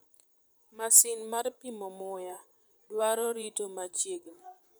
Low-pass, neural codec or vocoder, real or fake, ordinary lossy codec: none; vocoder, 44.1 kHz, 128 mel bands, Pupu-Vocoder; fake; none